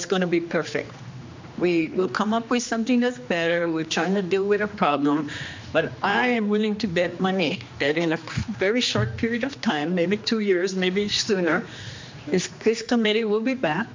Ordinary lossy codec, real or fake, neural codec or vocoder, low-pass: MP3, 64 kbps; fake; codec, 16 kHz, 2 kbps, X-Codec, HuBERT features, trained on general audio; 7.2 kHz